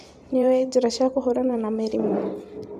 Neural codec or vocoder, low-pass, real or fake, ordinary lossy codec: vocoder, 44.1 kHz, 128 mel bands every 512 samples, BigVGAN v2; 14.4 kHz; fake; none